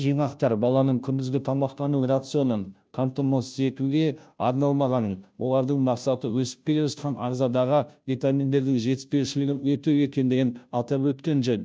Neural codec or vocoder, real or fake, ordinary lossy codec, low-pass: codec, 16 kHz, 0.5 kbps, FunCodec, trained on Chinese and English, 25 frames a second; fake; none; none